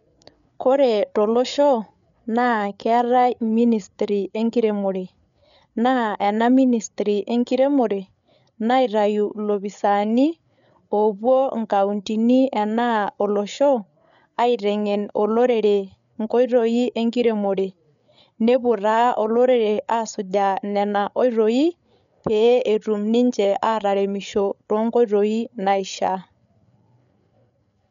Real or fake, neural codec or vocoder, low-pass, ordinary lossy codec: fake; codec, 16 kHz, 8 kbps, FreqCodec, larger model; 7.2 kHz; none